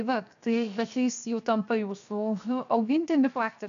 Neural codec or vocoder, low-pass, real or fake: codec, 16 kHz, 0.7 kbps, FocalCodec; 7.2 kHz; fake